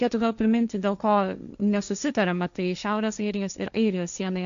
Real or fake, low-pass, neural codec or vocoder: fake; 7.2 kHz; codec, 16 kHz, 1.1 kbps, Voila-Tokenizer